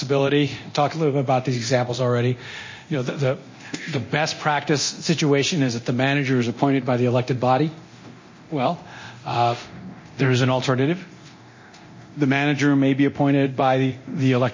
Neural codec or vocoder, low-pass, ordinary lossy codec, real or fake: codec, 24 kHz, 0.9 kbps, DualCodec; 7.2 kHz; MP3, 32 kbps; fake